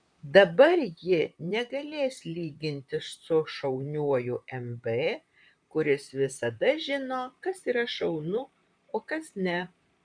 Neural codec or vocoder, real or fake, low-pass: vocoder, 44.1 kHz, 128 mel bands, Pupu-Vocoder; fake; 9.9 kHz